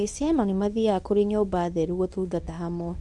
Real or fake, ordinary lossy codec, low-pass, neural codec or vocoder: fake; none; 10.8 kHz; codec, 24 kHz, 0.9 kbps, WavTokenizer, medium speech release version 1